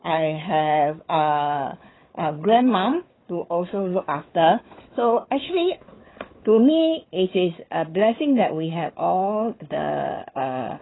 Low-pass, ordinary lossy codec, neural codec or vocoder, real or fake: 7.2 kHz; AAC, 16 kbps; codec, 16 kHz, 4 kbps, FunCodec, trained on Chinese and English, 50 frames a second; fake